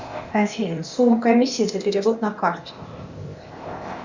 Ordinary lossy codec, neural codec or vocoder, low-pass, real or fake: Opus, 64 kbps; codec, 16 kHz, 0.8 kbps, ZipCodec; 7.2 kHz; fake